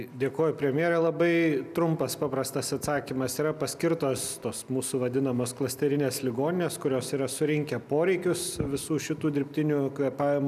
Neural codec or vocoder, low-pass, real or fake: none; 14.4 kHz; real